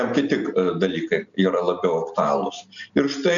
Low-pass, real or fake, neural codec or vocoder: 7.2 kHz; real; none